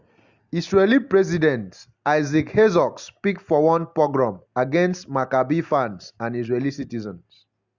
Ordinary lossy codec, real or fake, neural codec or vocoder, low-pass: Opus, 64 kbps; real; none; 7.2 kHz